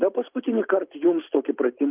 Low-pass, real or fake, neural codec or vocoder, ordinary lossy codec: 3.6 kHz; real; none; Opus, 24 kbps